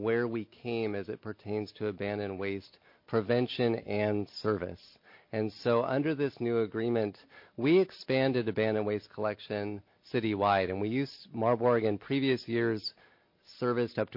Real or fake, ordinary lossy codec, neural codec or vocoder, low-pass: real; MP3, 32 kbps; none; 5.4 kHz